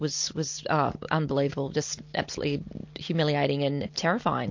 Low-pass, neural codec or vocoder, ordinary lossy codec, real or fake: 7.2 kHz; none; MP3, 48 kbps; real